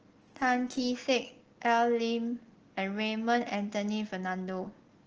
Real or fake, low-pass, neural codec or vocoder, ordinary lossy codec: real; 7.2 kHz; none; Opus, 16 kbps